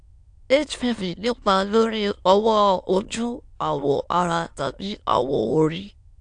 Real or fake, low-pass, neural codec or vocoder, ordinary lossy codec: fake; 9.9 kHz; autoencoder, 22.05 kHz, a latent of 192 numbers a frame, VITS, trained on many speakers; AAC, 64 kbps